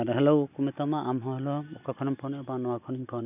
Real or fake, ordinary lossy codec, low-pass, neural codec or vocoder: real; none; 3.6 kHz; none